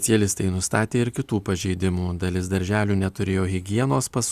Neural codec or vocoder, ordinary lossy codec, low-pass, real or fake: none; Opus, 64 kbps; 14.4 kHz; real